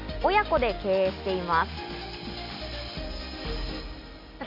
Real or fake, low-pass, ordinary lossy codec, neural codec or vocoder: real; 5.4 kHz; Opus, 64 kbps; none